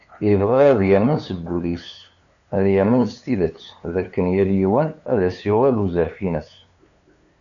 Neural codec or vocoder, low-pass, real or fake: codec, 16 kHz, 2 kbps, FunCodec, trained on LibriTTS, 25 frames a second; 7.2 kHz; fake